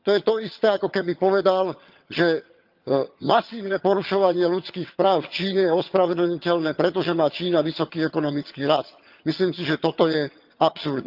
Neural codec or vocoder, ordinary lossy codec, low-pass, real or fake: vocoder, 22.05 kHz, 80 mel bands, HiFi-GAN; Opus, 24 kbps; 5.4 kHz; fake